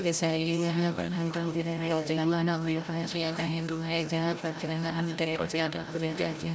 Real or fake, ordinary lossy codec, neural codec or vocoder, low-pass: fake; none; codec, 16 kHz, 0.5 kbps, FreqCodec, larger model; none